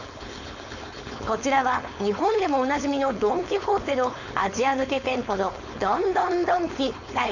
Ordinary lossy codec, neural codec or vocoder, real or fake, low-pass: none; codec, 16 kHz, 4.8 kbps, FACodec; fake; 7.2 kHz